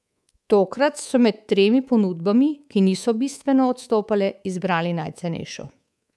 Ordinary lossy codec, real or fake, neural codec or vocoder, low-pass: none; fake; codec, 24 kHz, 3.1 kbps, DualCodec; none